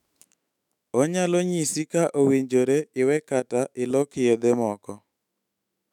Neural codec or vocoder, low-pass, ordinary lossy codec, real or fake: autoencoder, 48 kHz, 128 numbers a frame, DAC-VAE, trained on Japanese speech; 19.8 kHz; none; fake